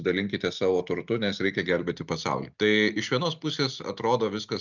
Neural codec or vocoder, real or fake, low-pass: none; real; 7.2 kHz